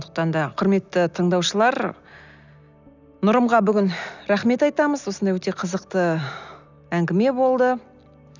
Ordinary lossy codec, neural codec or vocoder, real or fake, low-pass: none; none; real; 7.2 kHz